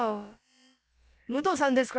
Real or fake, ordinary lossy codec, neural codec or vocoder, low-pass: fake; none; codec, 16 kHz, about 1 kbps, DyCAST, with the encoder's durations; none